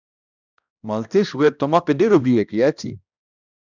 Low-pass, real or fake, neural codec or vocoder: 7.2 kHz; fake; codec, 16 kHz, 1 kbps, X-Codec, HuBERT features, trained on balanced general audio